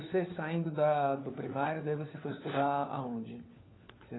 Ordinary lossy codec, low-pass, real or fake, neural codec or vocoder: AAC, 16 kbps; 7.2 kHz; fake; codec, 16 kHz, 4 kbps, FunCodec, trained on LibriTTS, 50 frames a second